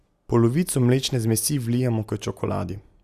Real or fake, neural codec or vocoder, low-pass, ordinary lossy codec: real; none; 14.4 kHz; Opus, 64 kbps